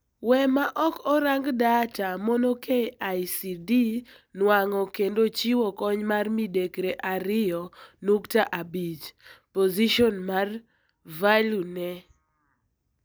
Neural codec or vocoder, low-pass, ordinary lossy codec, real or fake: none; none; none; real